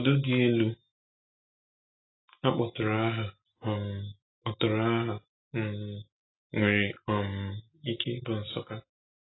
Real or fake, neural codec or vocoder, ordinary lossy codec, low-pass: real; none; AAC, 16 kbps; 7.2 kHz